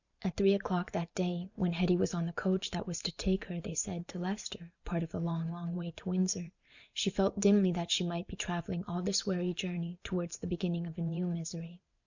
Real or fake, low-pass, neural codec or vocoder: fake; 7.2 kHz; vocoder, 44.1 kHz, 128 mel bands every 512 samples, BigVGAN v2